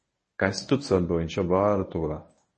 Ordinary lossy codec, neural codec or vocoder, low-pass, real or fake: MP3, 32 kbps; codec, 24 kHz, 0.9 kbps, WavTokenizer, medium speech release version 1; 10.8 kHz; fake